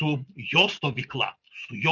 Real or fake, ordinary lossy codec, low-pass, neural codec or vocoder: real; Opus, 64 kbps; 7.2 kHz; none